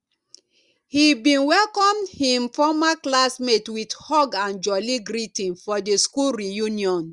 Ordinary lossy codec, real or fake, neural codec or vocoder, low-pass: Opus, 64 kbps; real; none; 10.8 kHz